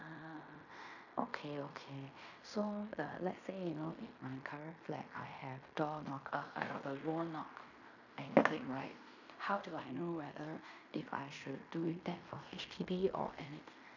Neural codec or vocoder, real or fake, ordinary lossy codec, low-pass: codec, 16 kHz in and 24 kHz out, 0.9 kbps, LongCat-Audio-Codec, fine tuned four codebook decoder; fake; none; 7.2 kHz